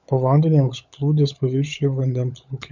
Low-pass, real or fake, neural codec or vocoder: 7.2 kHz; fake; codec, 16 kHz, 16 kbps, FunCodec, trained on LibriTTS, 50 frames a second